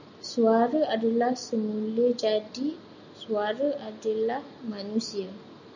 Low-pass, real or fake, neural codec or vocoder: 7.2 kHz; real; none